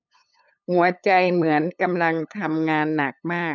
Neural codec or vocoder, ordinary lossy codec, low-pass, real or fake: codec, 16 kHz, 8 kbps, FunCodec, trained on LibriTTS, 25 frames a second; none; 7.2 kHz; fake